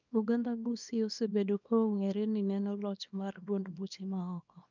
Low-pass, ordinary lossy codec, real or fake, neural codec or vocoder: 7.2 kHz; none; fake; codec, 24 kHz, 0.9 kbps, WavTokenizer, small release